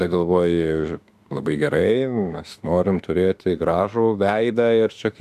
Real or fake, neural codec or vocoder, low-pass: fake; autoencoder, 48 kHz, 32 numbers a frame, DAC-VAE, trained on Japanese speech; 14.4 kHz